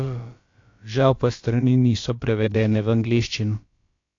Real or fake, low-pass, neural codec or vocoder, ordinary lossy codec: fake; 7.2 kHz; codec, 16 kHz, about 1 kbps, DyCAST, with the encoder's durations; AAC, 48 kbps